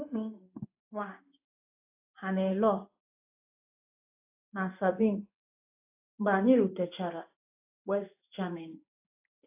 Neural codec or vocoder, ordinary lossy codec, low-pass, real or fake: codec, 16 kHz in and 24 kHz out, 1 kbps, XY-Tokenizer; AAC, 24 kbps; 3.6 kHz; fake